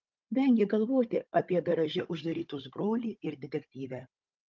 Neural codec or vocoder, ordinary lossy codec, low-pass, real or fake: codec, 16 kHz, 16 kbps, FunCodec, trained on Chinese and English, 50 frames a second; Opus, 24 kbps; 7.2 kHz; fake